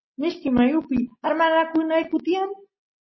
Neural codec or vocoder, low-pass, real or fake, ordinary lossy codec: none; 7.2 kHz; real; MP3, 24 kbps